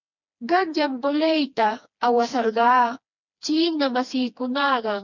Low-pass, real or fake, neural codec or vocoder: 7.2 kHz; fake; codec, 16 kHz, 2 kbps, FreqCodec, smaller model